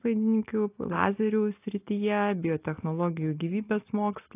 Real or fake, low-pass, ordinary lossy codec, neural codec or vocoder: real; 3.6 kHz; AAC, 24 kbps; none